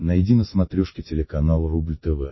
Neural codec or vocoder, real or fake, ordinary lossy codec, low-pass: none; real; MP3, 24 kbps; 7.2 kHz